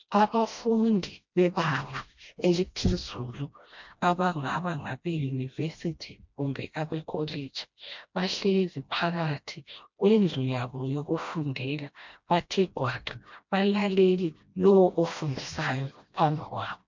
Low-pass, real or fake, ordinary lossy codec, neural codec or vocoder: 7.2 kHz; fake; MP3, 64 kbps; codec, 16 kHz, 1 kbps, FreqCodec, smaller model